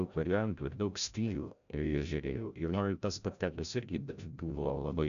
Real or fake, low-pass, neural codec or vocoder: fake; 7.2 kHz; codec, 16 kHz, 0.5 kbps, FreqCodec, larger model